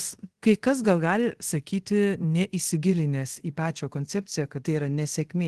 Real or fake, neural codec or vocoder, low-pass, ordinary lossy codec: fake; codec, 24 kHz, 0.5 kbps, DualCodec; 10.8 kHz; Opus, 16 kbps